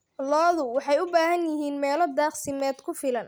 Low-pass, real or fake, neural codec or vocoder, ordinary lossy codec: none; real; none; none